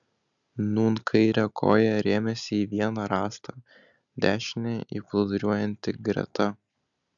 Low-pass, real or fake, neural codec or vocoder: 7.2 kHz; real; none